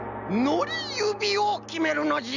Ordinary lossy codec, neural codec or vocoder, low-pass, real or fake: none; none; 7.2 kHz; real